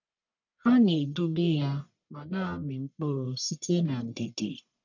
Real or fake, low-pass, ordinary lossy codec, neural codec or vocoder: fake; 7.2 kHz; none; codec, 44.1 kHz, 1.7 kbps, Pupu-Codec